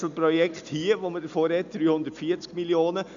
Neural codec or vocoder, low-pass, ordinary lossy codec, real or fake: none; 7.2 kHz; none; real